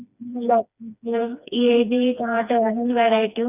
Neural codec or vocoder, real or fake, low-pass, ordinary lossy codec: codec, 16 kHz, 2 kbps, FreqCodec, smaller model; fake; 3.6 kHz; none